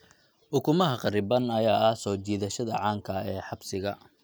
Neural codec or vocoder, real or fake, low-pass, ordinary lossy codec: none; real; none; none